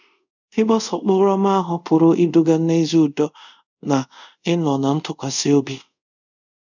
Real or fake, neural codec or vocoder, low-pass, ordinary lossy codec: fake; codec, 24 kHz, 0.5 kbps, DualCodec; 7.2 kHz; none